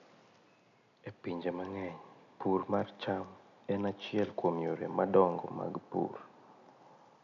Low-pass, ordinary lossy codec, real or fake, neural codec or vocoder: 7.2 kHz; none; real; none